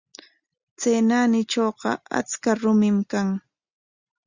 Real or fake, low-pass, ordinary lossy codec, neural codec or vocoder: real; 7.2 kHz; Opus, 64 kbps; none